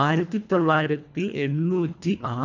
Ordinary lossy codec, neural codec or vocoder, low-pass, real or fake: none; codec, 24 kHz, 1.5 kbps, HILCodec; 7.2 kHz; fake